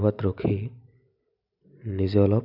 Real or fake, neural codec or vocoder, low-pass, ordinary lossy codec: real; none; 5.4 kHz; none